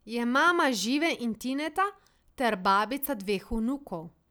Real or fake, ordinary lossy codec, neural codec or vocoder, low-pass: real; none; none; none